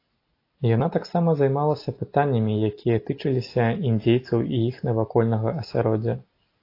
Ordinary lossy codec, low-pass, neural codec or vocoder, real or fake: AAC, 32 kbps; 5.4 kHz; none; real